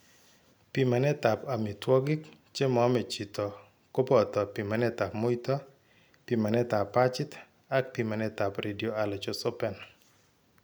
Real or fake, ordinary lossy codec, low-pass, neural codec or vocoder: real; none; none; none